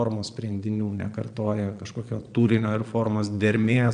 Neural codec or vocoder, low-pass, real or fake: vocoder, 22.05 kHz, 80 mel bands, Vocos; 9.9 kHz; fake